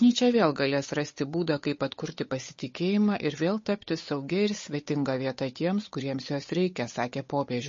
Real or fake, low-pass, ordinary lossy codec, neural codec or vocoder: fake; 7.2 kHz; MP3, 32 kbps; codec, 16 kHz, 8 kbps, FunCodec, trained on Chinese and English, 25 frames a second